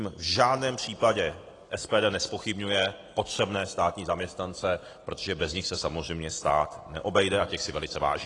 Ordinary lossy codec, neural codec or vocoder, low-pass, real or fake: AAC, 32 kbps; none; 10.8 kHz; real